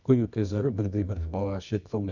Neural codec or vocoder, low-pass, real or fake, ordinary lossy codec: codec, 24 kHz, 0.9 kbps, WavTokenizer, medium music audio release; 7.2 kHz; fake; none